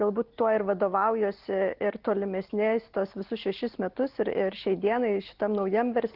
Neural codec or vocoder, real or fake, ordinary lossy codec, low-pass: none; real; Opus, 16 kbps; 5.4 kHz